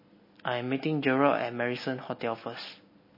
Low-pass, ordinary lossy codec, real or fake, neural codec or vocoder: 5.4 kHz; MP3, 24 kbps; real; none